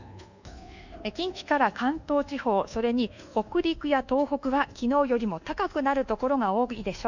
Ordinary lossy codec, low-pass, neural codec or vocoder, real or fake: none; 7.2 kHz; codec, 24 kHz, 1.2 kbps, DualCodec; fake